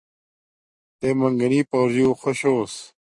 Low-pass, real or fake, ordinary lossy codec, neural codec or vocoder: 10.8 kHz; real; MP3, 48 kbps; none